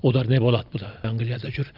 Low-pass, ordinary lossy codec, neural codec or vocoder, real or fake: 5.4 kHz; Opus, 32 kbps; none; real